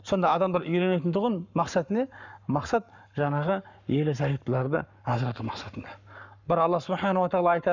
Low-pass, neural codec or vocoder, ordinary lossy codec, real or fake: 7.2 kHz; codec, 44.1 kHz, 7.8 kbps, Pupu-Codec; none; fake